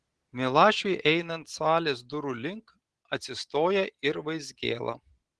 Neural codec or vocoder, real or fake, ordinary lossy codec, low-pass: none; real; Opus, 16 kbps; 10.8 kHz